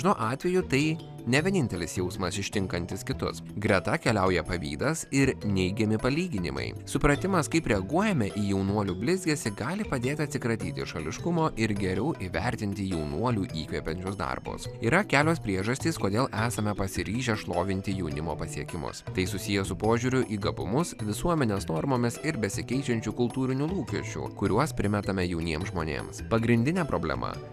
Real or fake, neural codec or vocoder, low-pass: real; none; 14.4 kHz